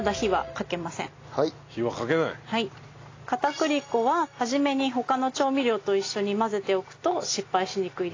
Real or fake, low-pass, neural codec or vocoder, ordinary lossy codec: real; 7.2 kHz; none; AAC, 32 kbps